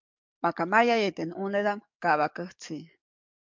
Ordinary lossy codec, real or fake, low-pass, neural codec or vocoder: MP3, 64 kbps; fake; 7.2 kHz; codec, 44.1 kHz, 7.8 kbps, DAC